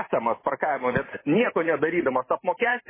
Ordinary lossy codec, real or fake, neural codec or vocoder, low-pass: MP3, 16 kbps; fake; vocoder, 44.1 kHz, 128 mel bands every 512 samples, BigVGAN v2; 3.6 kHz